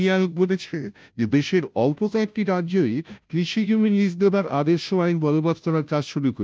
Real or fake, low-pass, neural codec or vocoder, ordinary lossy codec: fake; none; codec, 16 kHz, 0.5 kbps, FunCodec, trained on Chinese and English, 25 frames a second; none